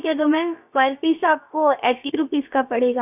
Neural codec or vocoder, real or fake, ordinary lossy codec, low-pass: codec, 16 kHz, about 1 kbps, DyCAST, with the encoder's durations; fake; none; 3.6 kHz